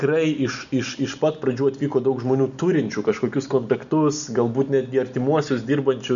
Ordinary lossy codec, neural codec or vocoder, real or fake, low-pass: MP3, 96 kbps; none; real; 7.2 kHz